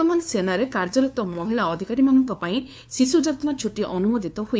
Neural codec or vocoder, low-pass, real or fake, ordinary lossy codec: codec, 16 kHz, 2 kbps, FunCodec, trained on LibriTTS, 25 frames a second; none; fake; none